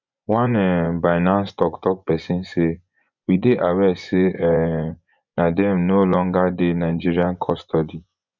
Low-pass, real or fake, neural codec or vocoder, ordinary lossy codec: 7.2 kHz; fake; vocoder, 24 kHz, 100 mel bands, Vocos; none